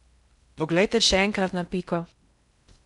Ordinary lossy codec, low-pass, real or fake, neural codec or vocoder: none; 10.8 kHz; fake; codec, 16 kHz in and 24 kHz out, 0.6 kbps, FocalCodec, streaming, 2048 codes